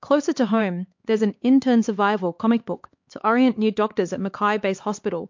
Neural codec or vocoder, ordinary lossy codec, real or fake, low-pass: codec, 16 kHz, 4 kbps, X-Codec, HuBERT features, trained on LibriSpeech; MP3, 48 kbps; fake; 7.2 kHz